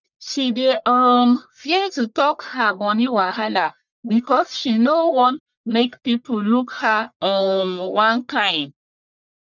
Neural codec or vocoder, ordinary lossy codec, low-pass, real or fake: codec, 44.1 kHz, 1.7 kbps, Pupu-Codec; none; 7.2 kHz; fake